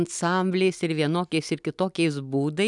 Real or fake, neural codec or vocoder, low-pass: real; none; 10.8 kHz